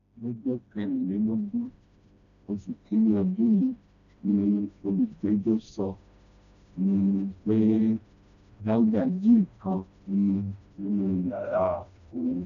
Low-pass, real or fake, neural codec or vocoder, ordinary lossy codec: 7.2 kHz; fake; codec, 16 kHz, 1 kbps, FreqCodec, smaller model; MP3, 96 kbps